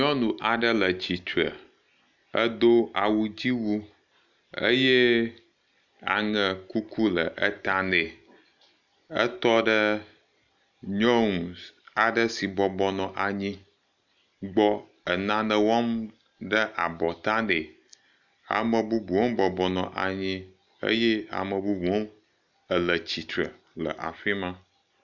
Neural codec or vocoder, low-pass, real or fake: none; 7.2 kHz; real